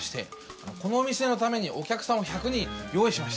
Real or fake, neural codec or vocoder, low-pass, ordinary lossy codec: real; none; none; none